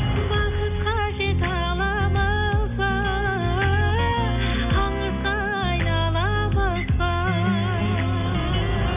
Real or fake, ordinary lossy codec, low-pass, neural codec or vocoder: real; none; 3.6 kHz; none